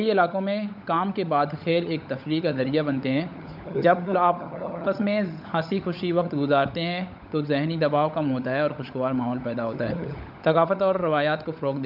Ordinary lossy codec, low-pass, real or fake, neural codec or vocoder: none; 5.4 kHz; fake; codec, 16 kHz, 16 kbps, FunCodec, trained on LibriTTS, 50 frames a second